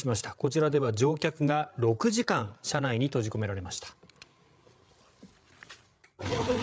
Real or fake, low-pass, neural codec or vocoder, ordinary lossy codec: fake; none; codec, 16 kHz, 8 kbps, FreqCodec, larger model; none